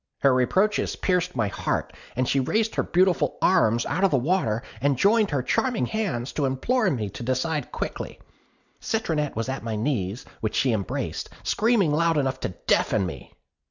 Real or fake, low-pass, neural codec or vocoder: real; 7.2 kHz; none